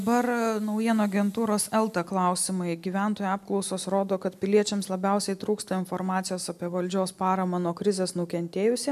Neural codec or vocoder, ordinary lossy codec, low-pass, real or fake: none; MP3, 96 kbps; 14.4 kHz; real